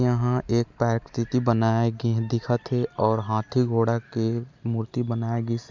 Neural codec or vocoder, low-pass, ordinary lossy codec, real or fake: none; 7.2 kHz; MP3, 64 kbps; real